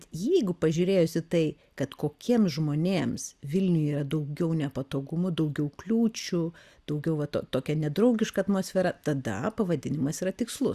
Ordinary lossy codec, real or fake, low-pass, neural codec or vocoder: Opus, 64 kbps; real; 14.4 kHz; none